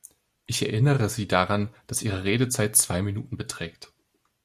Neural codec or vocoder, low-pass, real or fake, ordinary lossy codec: none; 14.4 kHz; real; Opus, 64 kbps